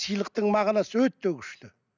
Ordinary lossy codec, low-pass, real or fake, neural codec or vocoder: none; 7.2 kHz; real; none